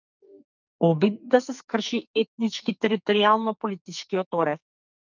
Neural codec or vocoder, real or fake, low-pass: codec, 44.1 kHz, 2.6 kbps, SNAC; fake; 7.2 kHz